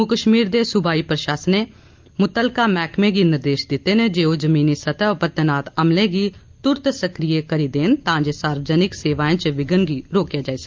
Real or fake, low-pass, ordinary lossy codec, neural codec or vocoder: real; 7.2 kHz; Opus, 24 kbps; none